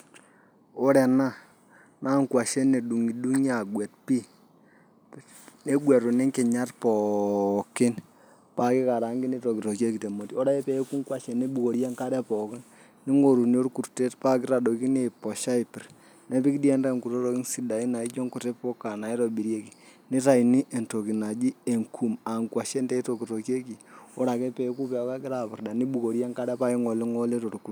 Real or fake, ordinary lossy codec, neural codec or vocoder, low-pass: real; none; none; none